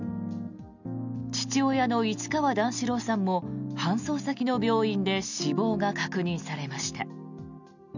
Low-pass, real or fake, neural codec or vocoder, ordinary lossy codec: 7.2 kHz; real; none; none